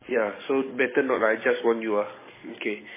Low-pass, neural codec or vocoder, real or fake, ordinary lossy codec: 3.6 kHz; codec, 44.1 kHz, 7.8 kbps, DAC; fake; MP3, 16 kbps